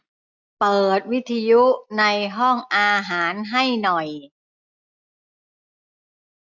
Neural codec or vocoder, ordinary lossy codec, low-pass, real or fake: none; none; 7.2 kHz; real